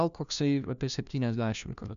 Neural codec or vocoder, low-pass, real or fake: codec, 16 kHz, 1 kbps, FunCodec, trained on LibriTTS, 50 frames a second; 7.2 kHz; fake